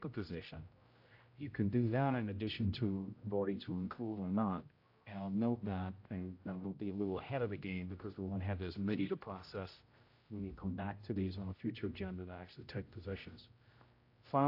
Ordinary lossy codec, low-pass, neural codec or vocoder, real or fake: AAC, 32 kbps; 5.4 kHz; codec, 16 kHz, 0.5 kbps, X-Codec, HuBERT features, trained on general audio; fake